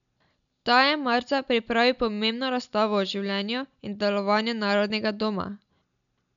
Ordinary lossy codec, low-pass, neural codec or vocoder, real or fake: none; 7.2 kHz; none; real